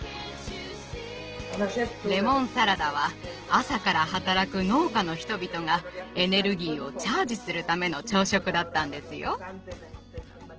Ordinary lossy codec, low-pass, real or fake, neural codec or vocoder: Opus, 16 kbps; 7.2 kHz; real; none